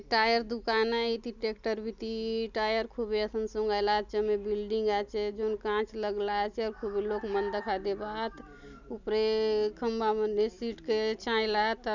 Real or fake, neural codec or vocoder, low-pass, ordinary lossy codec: real; none; 7.2 kHz; none